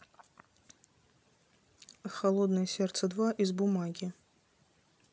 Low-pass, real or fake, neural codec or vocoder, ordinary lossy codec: none; real; none; none